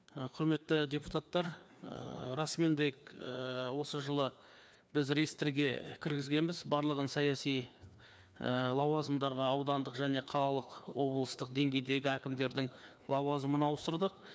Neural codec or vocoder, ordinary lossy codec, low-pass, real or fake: codec, 16 kHz, 2 kbps, FreqCodec, larger model; none; none; fake